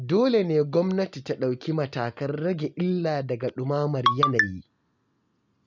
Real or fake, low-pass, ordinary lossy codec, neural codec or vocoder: real; 7.2 kHz; none; none